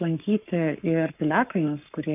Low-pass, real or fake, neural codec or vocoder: 3.6 kHz; fake; codec, 44.1 kHz, 7.8 kbps, Pupu-Codec